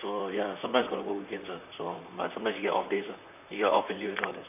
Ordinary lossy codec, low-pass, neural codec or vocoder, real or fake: none; 3.6 kHz; vocoder, 44.1 kHz, 128 mel bands, Pupu-Vocoder; fake